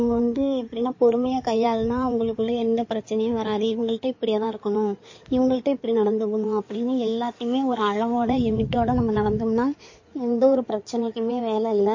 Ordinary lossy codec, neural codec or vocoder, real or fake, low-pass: MP3, 32 kbps; codec, 16 kHz in and 24 kHz out, 2.2 kbps, FireRedTTS-2 codec; fake; 7.2 kHz